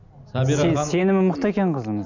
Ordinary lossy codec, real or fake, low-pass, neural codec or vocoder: none; real; 7.2 kHz; none